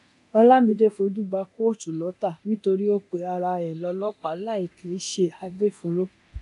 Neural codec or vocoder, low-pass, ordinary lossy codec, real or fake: codec, 24 kHz, 0.9 kbps, DualCodec; 10.8 kHz; none; fake